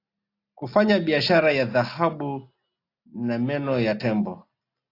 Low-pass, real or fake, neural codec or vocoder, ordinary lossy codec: 5.4 kHz; real; none; AAC, 32 kbps